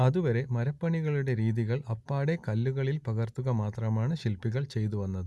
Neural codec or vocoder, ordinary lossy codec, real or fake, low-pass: none; none; real; none